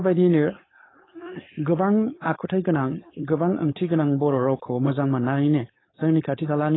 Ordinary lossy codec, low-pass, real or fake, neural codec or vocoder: AAC, 16 kbps; 7.2 kHz; fake; codec, 16 kHz, 4.8 kbps, FACodec